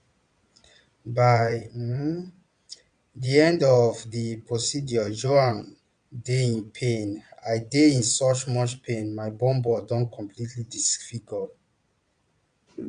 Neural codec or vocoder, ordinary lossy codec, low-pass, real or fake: vocoder, 22.05 kHz, 80 mel bands, Vocos; AAC, 96 kbps; 9.9 kHz; fake